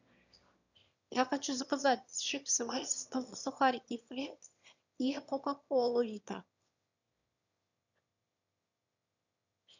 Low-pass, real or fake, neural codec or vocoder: 7.2 kHz; fake; autoencoder, 22.05 kHz, a latent of 192 numbers a frame, VITS, trained on one speaker